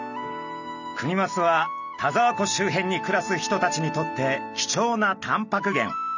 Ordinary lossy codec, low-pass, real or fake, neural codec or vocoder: none; 7.2 kHz; real; none